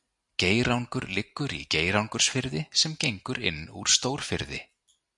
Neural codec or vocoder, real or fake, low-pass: none; real; 10.8 kHz